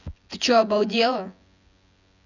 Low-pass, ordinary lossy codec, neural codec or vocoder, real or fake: 7.2 kHz; none; vocoder, 24 kHz, 100 mel bands, Vocos; fake